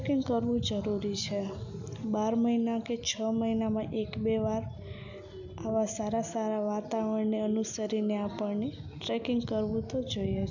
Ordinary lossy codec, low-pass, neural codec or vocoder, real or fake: none; 7.2 kHz; none; real